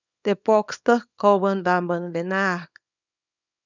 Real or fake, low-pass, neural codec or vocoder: fake; 7.2 kHz; codec, 24 kHz, 0.9 kbps, WavTokenizer, small release